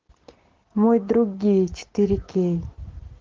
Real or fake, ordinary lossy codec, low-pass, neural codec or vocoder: real; Opus, 16 kbps; 7.2 kHz; none